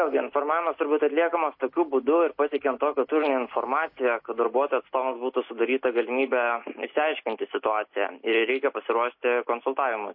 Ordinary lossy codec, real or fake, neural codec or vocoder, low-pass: MP3, 32 kbps; real; none; 5.4 kHz